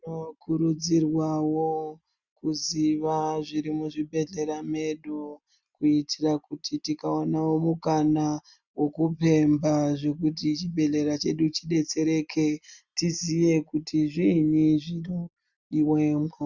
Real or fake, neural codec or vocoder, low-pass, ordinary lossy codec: real; none; 7.2 kHz; Opus, 64 kbps